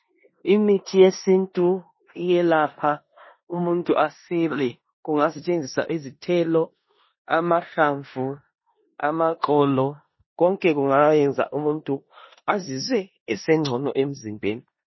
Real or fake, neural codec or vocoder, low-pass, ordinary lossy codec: fake; codec, 16 kHz in and 24 kHz out, 0.9 kbps, LongCat-Audio-Codec, four codebook decoder; 7.2 kHz; MP3, 24 kbps